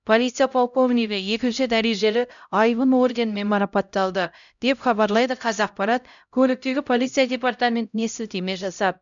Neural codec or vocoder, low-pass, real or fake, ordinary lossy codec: codec, 16 kHz, 0.5 kbps, X-Codec, HuBERT features, trained on LibriSpeech; 7.2 kHz; fake; none